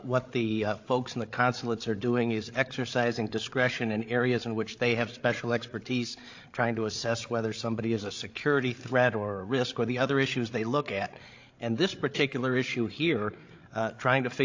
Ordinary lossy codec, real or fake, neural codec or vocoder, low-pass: AAC, 48 kbps; fake; codec, 16 kHz, 8 kbps, FreqCodec, larger model; 7.2 kHz